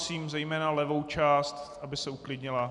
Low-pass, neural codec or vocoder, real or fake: 10.8 kHz; none; real